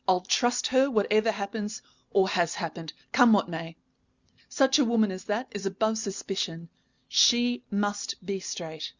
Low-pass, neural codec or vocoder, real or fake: 7.2 kHz; none; real